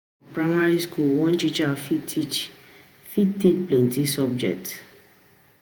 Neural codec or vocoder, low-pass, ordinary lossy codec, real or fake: vocoder, 48 kHz, 128 mel bands, Vocos; none; none; fake